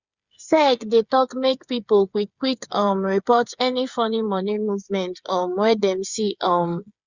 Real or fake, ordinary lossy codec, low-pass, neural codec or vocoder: fake; Opus, 64 kbps; 7.2 kHz; codec, 16 kHz, 8 kbps, FreqCodec, smaller model